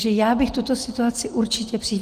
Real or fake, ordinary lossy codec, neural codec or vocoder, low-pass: real; Opus, 24 kbps; none; 14.4 kHz